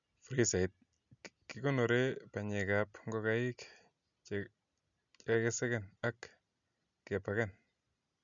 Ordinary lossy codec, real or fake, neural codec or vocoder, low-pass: none; real; none; 7.2 kHz